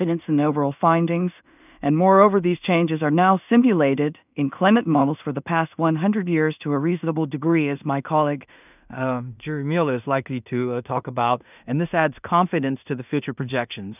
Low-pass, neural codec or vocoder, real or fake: 3.6 kHz; codec, 16 kHz in and 24 kHz out, 0.4 kbps, LongCat-Audio-Codec, two codebook decoder; fake